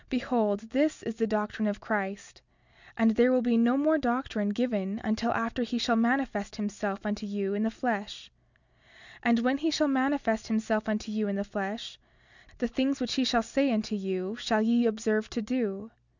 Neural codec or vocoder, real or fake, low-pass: none; real; 7.2 kHz